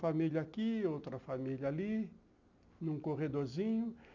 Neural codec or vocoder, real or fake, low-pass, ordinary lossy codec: none; real; 7.2 kHz; none